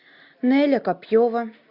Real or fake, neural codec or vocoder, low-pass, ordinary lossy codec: real; none; 5.4 kHz; AAC, 48 kbps